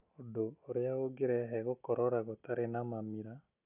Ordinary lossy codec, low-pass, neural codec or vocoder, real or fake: none; 3.6 kHz; none; real